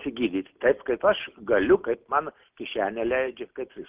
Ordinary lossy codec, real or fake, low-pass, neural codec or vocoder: Opus, 32 kbps; real; 3.6 kHz; none